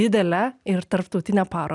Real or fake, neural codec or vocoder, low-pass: real; none; 10.8 kHz